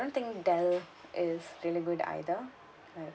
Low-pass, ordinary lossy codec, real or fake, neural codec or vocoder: none; none; real; none